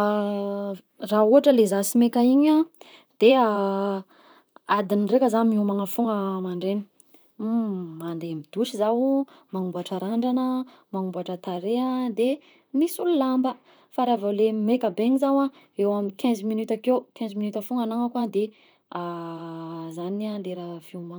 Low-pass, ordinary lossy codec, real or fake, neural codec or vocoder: none; none; fake; vocoder, 44.1 kHz, 128 mel bands, Pupu-Vocoder